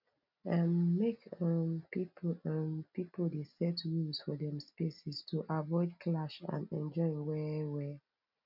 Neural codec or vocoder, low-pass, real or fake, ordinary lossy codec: none; 5.4 kHz; real; none